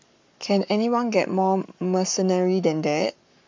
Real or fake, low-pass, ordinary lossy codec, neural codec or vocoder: fake; 7.2 kHz; MP3, 64 kbps; codec, 44.1 kHz, 7.8 kbps, Pupu-Codec